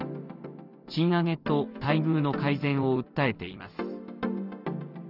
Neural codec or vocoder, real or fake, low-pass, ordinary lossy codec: none; real; 5.4 kHz; none